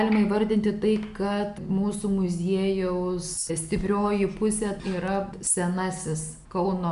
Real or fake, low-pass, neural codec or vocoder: real; 10.8 kHz; none